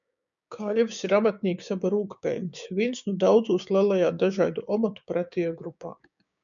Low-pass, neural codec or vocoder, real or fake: 7.2 kHz; codec, 16 kHz, 6 kbps, DAC; fake